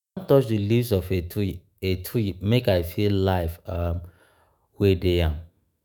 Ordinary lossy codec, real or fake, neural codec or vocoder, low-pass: none; fake; autoencoder, 48 kHz, 128 numbers a frame, DAC-VAE, trained on Japanese speech; none